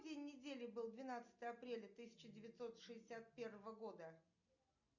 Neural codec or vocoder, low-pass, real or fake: none; 7.2 kHz; real